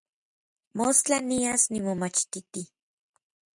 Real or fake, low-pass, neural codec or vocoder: real; 10.8 kHz; none